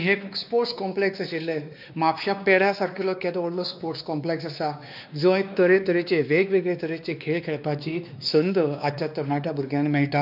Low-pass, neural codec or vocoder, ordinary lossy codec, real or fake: 5.4 kHz; codec, 16 kHz, 2 kbps, X-Codec, WavLM features, trained on Multilingual LibriSpeech; none; fake